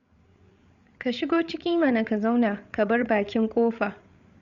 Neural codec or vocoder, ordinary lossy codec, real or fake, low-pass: codec, 16 kHz, 8 kbps, FreqCodec, larger model; Opus, 64 kbps; fake; 7.2 kHz